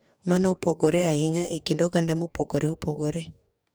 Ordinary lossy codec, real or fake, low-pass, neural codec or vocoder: none; fake; none; codec, 44.1 kHz, 2.6 kbps, DAC